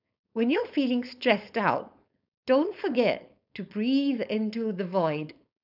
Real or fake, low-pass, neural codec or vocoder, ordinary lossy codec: fake; 5.4 kHz; codec, 16 kHz, 4.8 kbps, FACodec; none